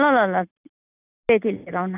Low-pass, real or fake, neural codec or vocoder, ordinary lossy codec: 3.6 kHz; real; none; none